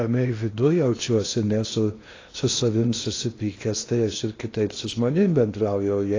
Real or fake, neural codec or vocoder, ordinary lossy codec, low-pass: fake; codec, 16 kHz in and 24 kHz out, 0.8 kbps, FocalCodec, streaming, 65536 codes; AAC, 32 kbps; 7.2 kHz